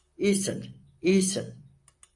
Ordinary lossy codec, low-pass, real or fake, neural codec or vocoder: AAC, 64 kbps; 10.8 kHz; fake; vocoder, 44.1 kHz, 128 mel bands, Pupu-Vocoder